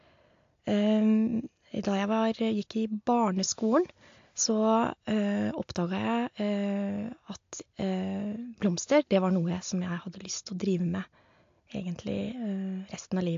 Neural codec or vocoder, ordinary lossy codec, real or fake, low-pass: none; AAC, 48 kbps; real; 7.2 kHz